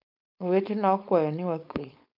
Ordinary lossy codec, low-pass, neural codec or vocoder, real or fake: MP3, 48 kbps; 5.4 kHz; codec, 16 kHz, 4.8 kbps, FACodec; fake